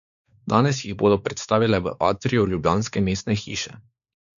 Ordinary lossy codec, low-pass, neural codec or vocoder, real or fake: none; 7.2 kHz; codec, 16 kHz, 4 kbps, X-Codec, WavLM features, trained on Multilingual LibriSpeech; fake